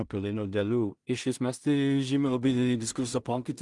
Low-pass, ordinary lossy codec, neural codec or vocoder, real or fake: 10.8 kHz; Opus, 24 kbps; codec, 16 kHz in and 24 kHz out, 0.4 kbps, LongCat-Audio-Codec, two codebook decoder; fake